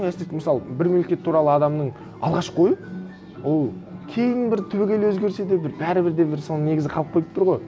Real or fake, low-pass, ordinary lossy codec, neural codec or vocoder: real; none; none; none